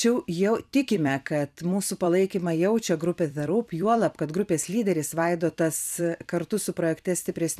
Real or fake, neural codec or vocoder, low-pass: fake; vocoder, 44.1 kHz, 128 mel bands every 256 samples, BigVGAN v2; 14.4 kHz